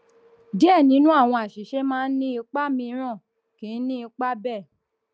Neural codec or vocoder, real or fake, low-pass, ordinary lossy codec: none; real; none; none